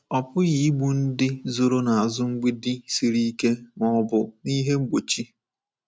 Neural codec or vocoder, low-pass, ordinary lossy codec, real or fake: none; none; none; real